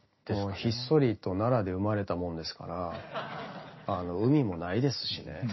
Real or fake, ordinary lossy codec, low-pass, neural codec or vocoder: real; MP3, 24 kbps; 7.2 kHz; none